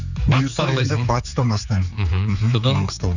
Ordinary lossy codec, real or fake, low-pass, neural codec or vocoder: none; fake; 7.2 kHz; codec, 16 kHz, 4 kbps, X-Codec, HuBERT features, trained on general audio